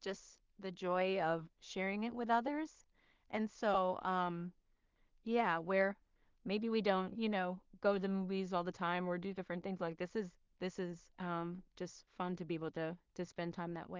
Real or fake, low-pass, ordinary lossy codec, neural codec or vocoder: fake; 7.2 kHz; Opus, 32 kbps; codec, 16 kHz in and 24 kHz out, 0.4 kbps, LongCat-Audio-Codec, two codebook decoder